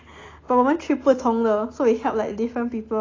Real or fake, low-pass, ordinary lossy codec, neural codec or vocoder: real; 7.2 kHz; AAC, 32 kbps; none